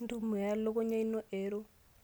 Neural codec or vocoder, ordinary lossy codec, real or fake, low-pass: none; none; real; none